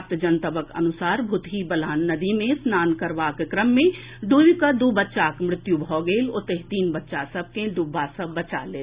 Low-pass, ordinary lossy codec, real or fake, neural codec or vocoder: 3.6 kHz; Opus, 64 kbps; real; none